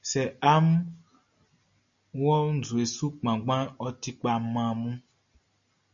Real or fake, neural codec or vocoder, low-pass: real; none; 7.2 kHz